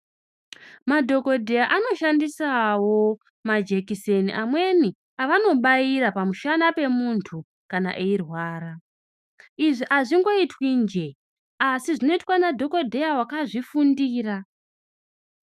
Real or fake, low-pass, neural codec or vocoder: fake; 14.4 kHz; autoencoder, 48 kHz, 128 numbers a frame, DAC-VAE, trained on Japanese speech